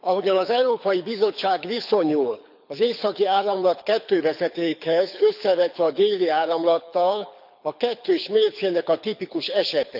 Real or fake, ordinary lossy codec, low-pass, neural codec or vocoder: fake; none; 5.4 kHz; codec, 24 kHz, 6 kbps, HILCodec